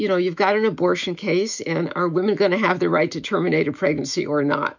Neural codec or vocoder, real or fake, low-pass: autoencoder, 48 kHz, 128 numbers a frame, DAC-VAE, trained on Japanese speech; fake; 7.2 kHz